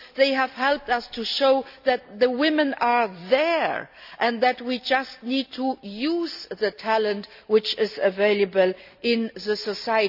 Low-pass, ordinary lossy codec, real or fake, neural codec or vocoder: 5.4 kHz; AAC, 48 kbps; real; none